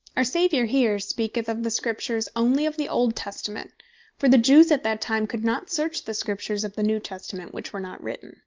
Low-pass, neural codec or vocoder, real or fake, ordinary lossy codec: 7.2 kHz; none; real; Opus, 32 kbps